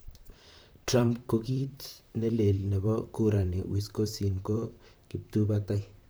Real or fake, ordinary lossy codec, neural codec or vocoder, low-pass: fake; none; vocoder, 44.1 kHz, 128 mel bands, Pupu-Vocoder; none